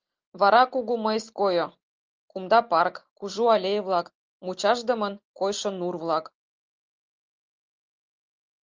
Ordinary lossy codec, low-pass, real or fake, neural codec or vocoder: Opus, 24 kbps; 7.2 kHz; real; none